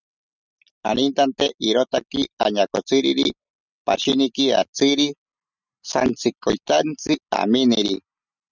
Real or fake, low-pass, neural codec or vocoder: real; 7.2 kHz; none